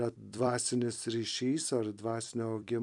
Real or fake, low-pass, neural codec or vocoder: fake; 10.8 kHz; vocoder, 44.1 kHz, 128 mel bands every 256 samples, BigVGAN v2